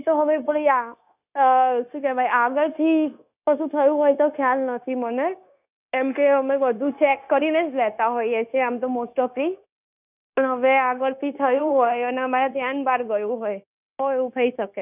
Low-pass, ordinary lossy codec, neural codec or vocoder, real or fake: 3.6 kHz; none; codec, 16 kHz, 0.9 kbps, LongCat-Audio-Codec; fake